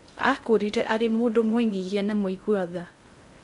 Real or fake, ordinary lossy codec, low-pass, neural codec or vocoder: fake; MP3, 64 kbps; 10.8 kHz; codec, 16 kHz in and 24 kHz out, 0.6 kbps, FocalCodec, streaming, 2048 codes